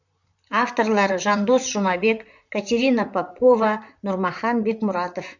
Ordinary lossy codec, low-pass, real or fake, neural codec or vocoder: none; 7.2 kHz; fake; vocoder, 44.1 kHz, 128 mel bands, Pupu-Vocoder